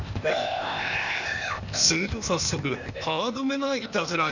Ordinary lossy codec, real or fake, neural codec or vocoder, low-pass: none; fake; codec, 16 kHz, 0.8 kbps, ZipCodec; 7.2 kHz